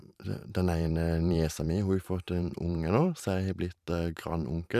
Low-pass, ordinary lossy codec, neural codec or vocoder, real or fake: 14.4 kHz; none; vocoder, 44.1 kHz, 128 mel bands every 512 samples, BigVGAN v2; fake